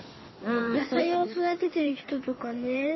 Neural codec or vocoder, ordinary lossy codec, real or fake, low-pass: codec, 16 kHz in and 24 kHz out, 1.1 kbps, FireRedTTS-2 codec; MP3, 24 kbps; fake; 7.2 kHz